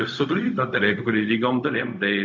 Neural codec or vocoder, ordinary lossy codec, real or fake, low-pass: codec, 16 kHz, 0.4 kbps, LongCat-Audio-Codec; MP3, 64 kbps; fake; 7.2 kHz